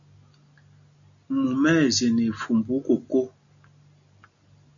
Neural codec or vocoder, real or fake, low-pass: none; real; 7.2 kHz